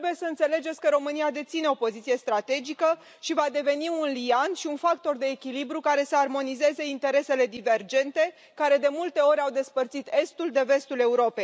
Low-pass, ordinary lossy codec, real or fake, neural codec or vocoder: none; none; real; none